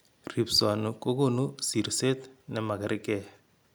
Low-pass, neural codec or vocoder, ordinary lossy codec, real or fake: none; none; none; real